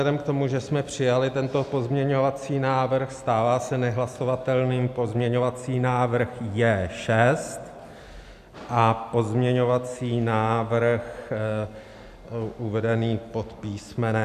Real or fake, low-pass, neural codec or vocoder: real; 14.4 kHz; none